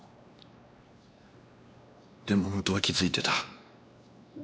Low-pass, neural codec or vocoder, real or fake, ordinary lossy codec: none; codec, 16 kHz, 2 kbps, X-Codec, WavLM features, trained on Multilingual LibriSpeech; fake; none